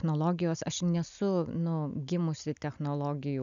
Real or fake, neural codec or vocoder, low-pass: real; none; 7.2 kHz